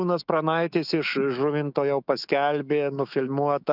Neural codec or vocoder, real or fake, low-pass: autoencoder, 48 kHz, 128 numbers a frame, DAC-VAE, trained on Japanese speech; fake; 5.4 kHz